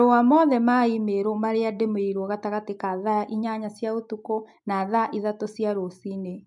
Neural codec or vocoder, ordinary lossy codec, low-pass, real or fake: none; MP3, 64 kbps; 10.8 kHz; real